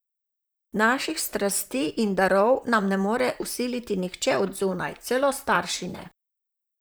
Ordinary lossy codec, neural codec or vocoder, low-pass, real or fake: none; vocoder, 44.1 kHz, 128 mel bands, Pupu-Vocoder; none; fake